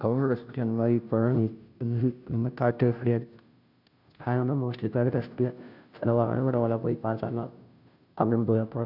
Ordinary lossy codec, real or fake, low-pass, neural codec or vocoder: none; fake; 5.4 kHz; codec, 16 kHz, 0.5 kbps, FunCodec, trained on Chinese and English, 25 frames a second